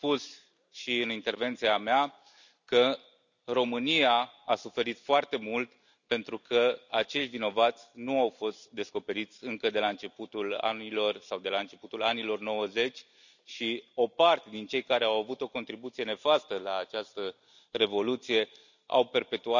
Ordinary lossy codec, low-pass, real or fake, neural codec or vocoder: none; 7.2 kHz; real; none